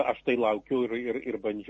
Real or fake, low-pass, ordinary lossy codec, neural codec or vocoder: real; 10.8 kHz; MP3, 32 kbps; none